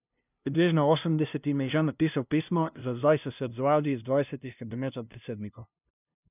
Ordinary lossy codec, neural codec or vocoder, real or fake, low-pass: none; codec, 16 kHz, 0.5 kbps, FunCodec, trained on LibriTTS, 25 frames a second; fake; 3.6 kHz